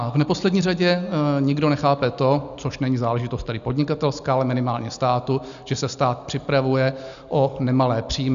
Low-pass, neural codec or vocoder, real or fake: 7.2 kHz; none; real